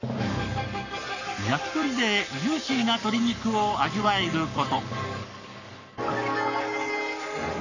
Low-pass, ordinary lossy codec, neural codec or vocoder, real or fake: 7.2 kHz; none; codec, 44.1 kHz, 7.8 kbps, Pupu-Codec; fake